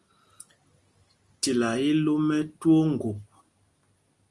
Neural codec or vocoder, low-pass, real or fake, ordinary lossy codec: none; 10.8 kHz; real; Opus, 32 kbps